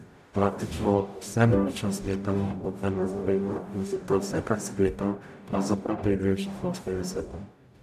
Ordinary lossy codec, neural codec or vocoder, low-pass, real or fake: none; codec, 44.1 kHz, 0.9 kbps, DAC; 14.4 kHz; fake